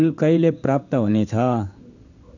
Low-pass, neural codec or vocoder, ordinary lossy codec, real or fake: 7.2 kHz; codec, 16 kHz in and 24 kHz out, 1 kbps, XY-Tokenizer; none; fake